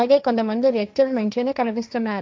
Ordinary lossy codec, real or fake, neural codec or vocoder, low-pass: none; fake; codec, 16 kHz, 1.1 kbps, Voila-Tokenizer; none